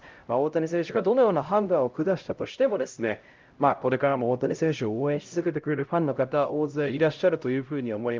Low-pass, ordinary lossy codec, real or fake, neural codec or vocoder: 7.2 kHz; Opus, 24 kbps; fake; codec, 16 kHz, 0.5 kbps, X-Codec, HuBERT features, trained on LibriSpeech